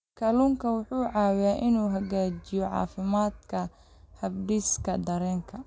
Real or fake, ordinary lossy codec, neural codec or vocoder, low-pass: real; none; none; none